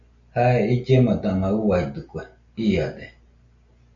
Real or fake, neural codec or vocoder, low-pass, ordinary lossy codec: real; none; 7.2 kHz; MP3, 96 kbps